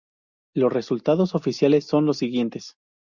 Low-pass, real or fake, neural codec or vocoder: 7.2 kHz; real; none